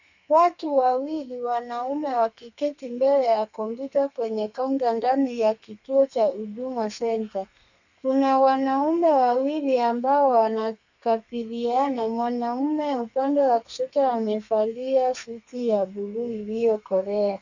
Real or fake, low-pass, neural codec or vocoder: fake; 7.2 kHz; codec, 32 kHz, 1.9 kbps, SNAC